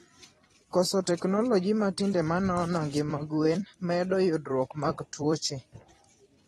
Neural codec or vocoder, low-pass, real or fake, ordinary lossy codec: vocoder, 44.1 kHz, 128 mel bands every 256 samples, BigVGAN v2; 19.8 kHz; fake; AAC, 32 kbps